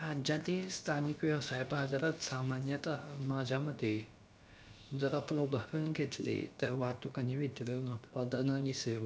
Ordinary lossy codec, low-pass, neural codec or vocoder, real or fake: none; none; codec, 16 kHz, about 1 kbps, DyCAST, with the encoder's durations; fake